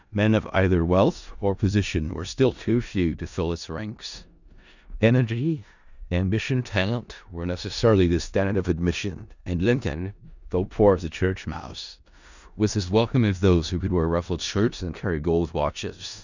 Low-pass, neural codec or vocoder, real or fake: 7.2 kHz; codec, 16 kHz in and 24 kHz out, 0.4 kbps, LongCat-Audio-Codec, four codebook decoder; fake